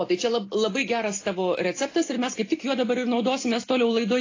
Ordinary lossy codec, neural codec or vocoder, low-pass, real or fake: AAC, 32 kbps; none; 7.2 kHz; real